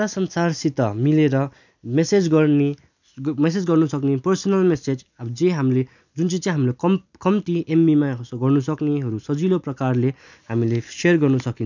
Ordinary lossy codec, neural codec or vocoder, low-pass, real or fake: none; none; 7.2 kHz; real